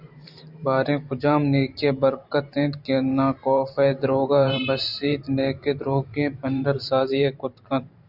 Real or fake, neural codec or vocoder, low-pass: fake; vocoder, 44.1 kHz, 128 mel bands every 512 samples, BigVGAN v2; 5.4 kHz